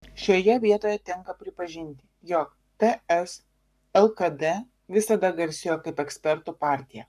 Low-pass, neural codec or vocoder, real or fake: 14.4 kHz; codec, 44.1 kHz, 7.8 kbps, Pupu-Codec; fake